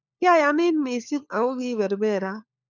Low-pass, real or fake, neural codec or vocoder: 7.2 kHz; fake; codec, 16 kHz, 4 kbps, FunCodec, trained on LibriTTS, 50 frames a second